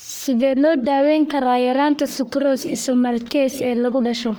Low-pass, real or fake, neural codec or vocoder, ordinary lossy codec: none; fake; codec, 44.1 kHz, 1.7 kbps, Pupu-Codec; none